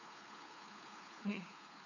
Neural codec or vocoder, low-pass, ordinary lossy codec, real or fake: codec, 16 kHz, 4 kbps, FunCodec, trained on LibriTTS, 50 frames a second; 7.2 kHz; none; fake